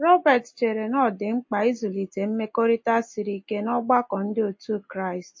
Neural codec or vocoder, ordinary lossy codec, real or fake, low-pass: none; MP3, 32 kbps; real; 7.2 kHz